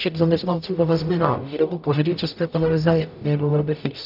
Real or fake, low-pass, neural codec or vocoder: fake; 5.4 kHz; codec, 44.1 kHz, 0.9 kbps, DAC